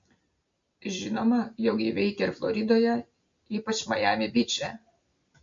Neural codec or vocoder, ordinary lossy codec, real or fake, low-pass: none; MP3, 48 kbps; real; 7.2 kHz